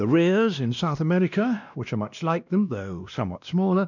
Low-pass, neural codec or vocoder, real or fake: 7.2 kHz; codec, 16 kHz, 1 kbps, X-Codec, WavLM features, trained on Multilingual LibriSpeech; fake